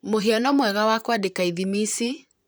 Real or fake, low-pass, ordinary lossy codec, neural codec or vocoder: fake; none; none; vocoder, 44.1 kHz, 128 mel bands, Pupu-Vocoder